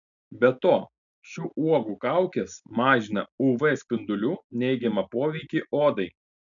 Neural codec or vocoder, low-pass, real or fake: none; 7.2 kHz; real